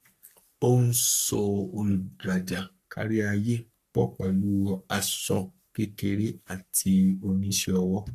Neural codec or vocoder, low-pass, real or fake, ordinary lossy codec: codec, 44.1 kHz, 3.4 kbps, Pupu-Codec; 14.4 kHz; fake; AAC, 64 kbps